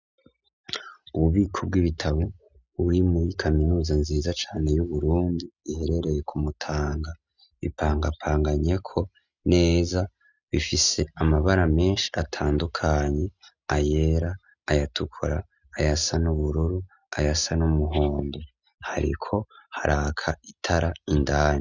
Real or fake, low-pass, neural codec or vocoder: real; 7.2 kHz; none